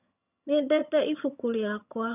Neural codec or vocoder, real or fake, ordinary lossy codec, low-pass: vocoder, 22.05 kHz, 80 mel bands, HiFi-GAN; fake; none; 3.6 kHz